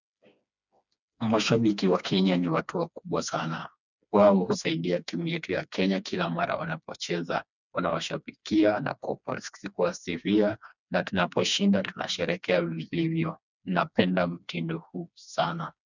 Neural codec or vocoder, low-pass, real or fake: codec, 16 kHz, 2 kbps, FreqCodec, smaller model; 7.2 kHz; fake